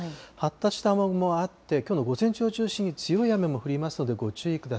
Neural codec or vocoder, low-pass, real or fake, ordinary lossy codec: none; none; real; none